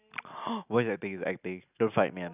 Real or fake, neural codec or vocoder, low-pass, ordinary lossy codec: real; none; 3.6 kHz; none